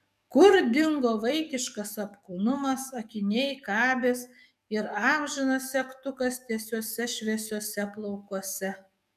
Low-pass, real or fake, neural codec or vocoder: 14.4 kHz; fake; codec, 44.1 kHz, 7.8 kbps, DAC